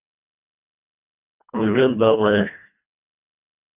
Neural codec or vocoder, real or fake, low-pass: codec, 24 kHz, 1.5 kbps, HILCodec; fake; 3.6 kHz